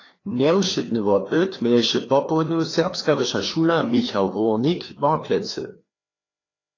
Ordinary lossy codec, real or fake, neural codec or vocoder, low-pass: AAC, 32 kbps; fake; codec, 16 kHz, 2 kbps, FreqCodec, larger model; 7.2 kHz